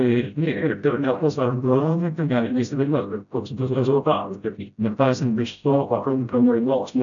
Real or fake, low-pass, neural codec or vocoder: fake; 7.2 kHz; codec, 16 kHz, 0.5 kbps, FreqCodec, smaller model